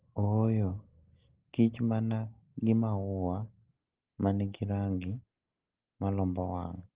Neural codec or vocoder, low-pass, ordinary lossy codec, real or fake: none; 3.6 kHz; Opus, 16 kbps; real